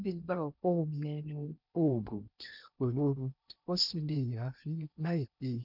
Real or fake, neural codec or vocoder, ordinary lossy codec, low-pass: fake; codec, 16 kHz in and 24 kHz out, 0.6 kbps, FocalCodec, streaming, 2048 codes; none; 5.4 kHz